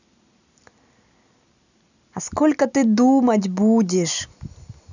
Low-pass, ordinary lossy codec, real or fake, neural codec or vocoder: 7.2 kHz; none; real; none